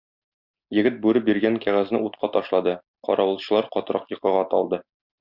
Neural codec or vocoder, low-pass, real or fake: none; 5.4 kHz; real